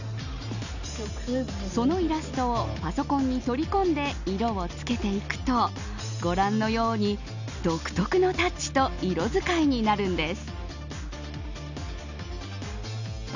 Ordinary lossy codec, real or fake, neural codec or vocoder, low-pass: none; real; none; 7.2 kHz